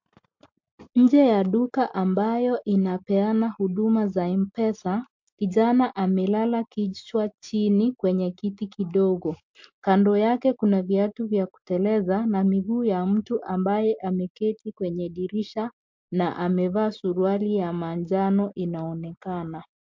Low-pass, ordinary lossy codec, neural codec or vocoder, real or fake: 7.2 kHz; MP3, 48 kbps; none; real